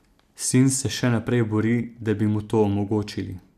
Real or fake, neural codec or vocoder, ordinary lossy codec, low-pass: real; none; none; 14.4 kHz